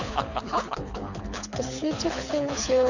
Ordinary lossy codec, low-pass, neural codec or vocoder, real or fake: none; 7.2 kHz; codec, 24 kHz, 6 kbps, HILCodec; fake